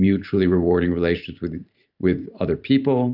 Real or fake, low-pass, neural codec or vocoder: fake; 5.4 kHz; vocoder, 44.1 kHz, 128 mel bands every 512 samples, BigVGAN v2